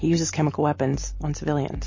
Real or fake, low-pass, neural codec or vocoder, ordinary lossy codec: real; 7.2 kHz; none; MP3, 32 kbps